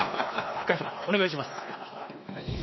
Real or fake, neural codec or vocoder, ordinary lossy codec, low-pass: fake; codec, 16 kHz, 2 kbps, X-Codec, WavLM features, trained on Multilingual LibriSpeech; MP3, 24 kbps; 7.2 kHz